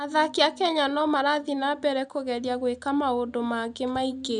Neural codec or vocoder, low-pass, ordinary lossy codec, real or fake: none; 9.9 kHz; none; real